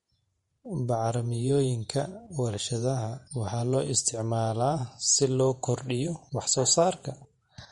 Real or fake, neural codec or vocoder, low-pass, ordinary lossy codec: fake; vocoder, 48 kHz, 128 mel bands, Vocos; 19.8 kHz; MP3, 48 kbps